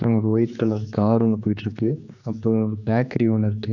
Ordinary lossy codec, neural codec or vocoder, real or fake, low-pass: none; codec, 16 kHz, 2 kbps, X-Codec, HuBERT features, trained on general audio; fake; 7.2 kHz